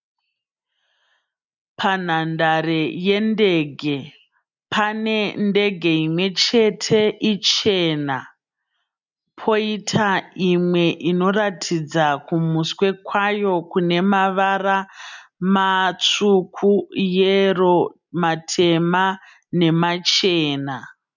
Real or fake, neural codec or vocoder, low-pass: real; none; 7.2 kHz